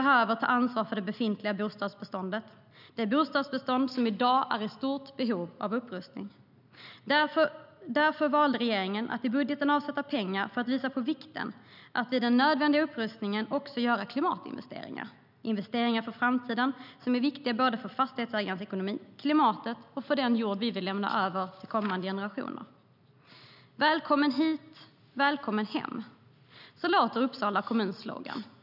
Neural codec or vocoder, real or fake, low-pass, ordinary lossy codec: none; real; 5.4 kHz; none